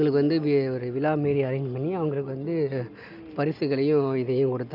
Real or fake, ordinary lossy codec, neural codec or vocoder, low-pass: real; none; none; 5.4 kHz